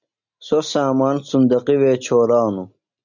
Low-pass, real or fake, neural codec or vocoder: 7.2 kHz; real; none